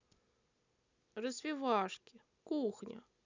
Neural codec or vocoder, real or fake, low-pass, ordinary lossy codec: none; real; 7.2 kHz; none